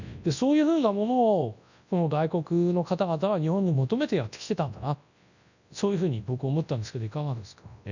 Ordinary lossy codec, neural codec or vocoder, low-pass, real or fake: none; codec, 24 kHz, 0.9 kbps, WavTokenizer, large speech release; 7.2 kHz; fake